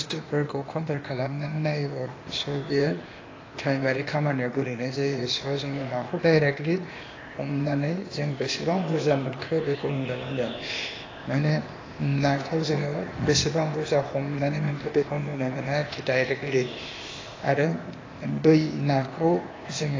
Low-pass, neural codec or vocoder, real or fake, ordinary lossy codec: 7.2 kHz; codec, 16 kHz, 0.8 kbps, ZipCodec; fake; AAC, 32 kbps